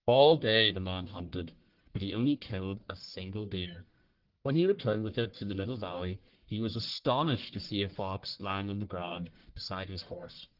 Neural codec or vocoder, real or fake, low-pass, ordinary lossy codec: codec, 44.1 kHz, 1.7 kbps, Pupu-Codec; fake; 5.4 kHz; Opus, 16 kbps